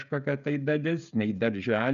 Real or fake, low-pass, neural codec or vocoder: fake; 7.2 kHz; codec, 16 kHz, 16 kbps, FreqCodec, smaller model